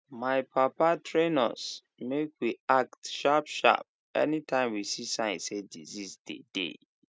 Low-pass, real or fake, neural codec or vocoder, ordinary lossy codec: none; real; none; none